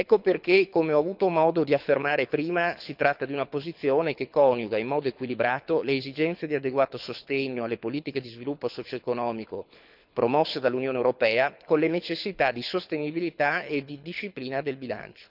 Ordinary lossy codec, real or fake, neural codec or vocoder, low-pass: none; fake; codec, 24 kHz, 6 kbps, HILCodec; 5.4 kHz